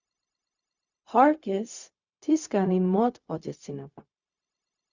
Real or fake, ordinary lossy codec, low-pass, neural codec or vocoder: fake; Opus, 64 kbps; 7.2 kHz; codec, 16 kHz, 0.4 kbps, LongCat-Audio-Codec